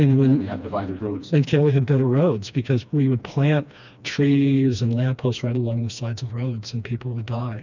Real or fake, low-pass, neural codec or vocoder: fake; 7.2 kHz; codec, 16 kHz, 2 kbps, FreqCodec, smaller model